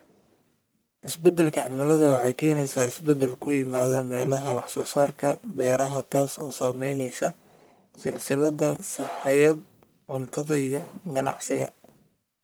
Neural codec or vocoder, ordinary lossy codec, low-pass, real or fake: codec, 44.1 kHz, 1.7 kbps, Pupu-Codec; none; none; fake